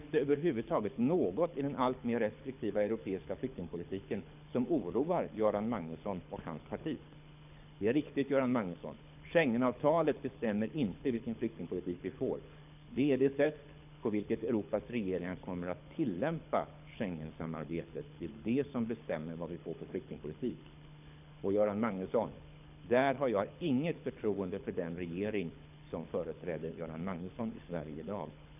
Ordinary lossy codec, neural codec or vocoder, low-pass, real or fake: none; codec, 24 kHz, 6 kbps, HILCodec; 3.6 kHz; fake